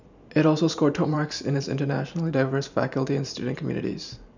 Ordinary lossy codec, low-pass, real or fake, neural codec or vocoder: none; 7.2 kHz; fake; vocoder, 44.1 kHz, 128 mel bands every 512 samples, BigVGAN v2